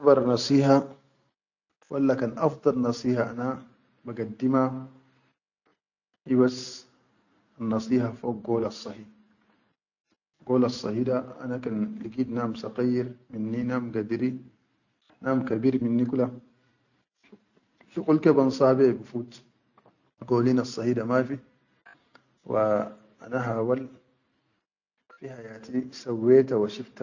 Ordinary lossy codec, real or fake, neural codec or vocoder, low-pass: none; real; none; 7.2 kHz